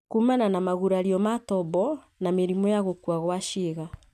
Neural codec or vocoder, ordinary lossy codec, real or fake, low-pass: none; none; real; 14.4 kHz